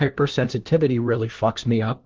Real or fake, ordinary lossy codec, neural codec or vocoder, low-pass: fake; Opus, 16 kbps; codec, 16 kHz, 1 kbps, FunCodec, trained on LibriTTS, 50 frames a second; 7.2 kHz